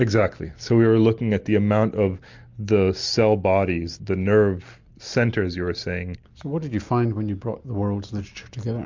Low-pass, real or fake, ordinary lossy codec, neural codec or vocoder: 7.2 kHz; real; MP3, 64 kbps; none